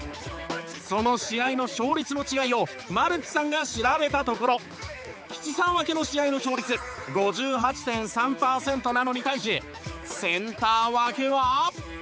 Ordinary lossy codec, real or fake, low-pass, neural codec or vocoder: none; fake; none; codec, 16 kHz, 4 kbps, X-Codec, HuBERT features, trained on balanced general audio